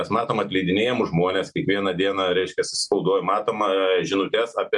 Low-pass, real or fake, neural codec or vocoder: 10.8 kHz; real; none